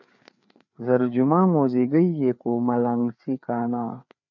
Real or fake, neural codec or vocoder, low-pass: fake; codec, 16 kHz, 4 kbps, FreqCodec, larger model; 7.2 kHz